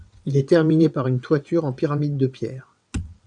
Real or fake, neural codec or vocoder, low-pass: fake; vocoder, 22.05 kHz, 80 mel bands, WaveNeXt; 9.9 kHz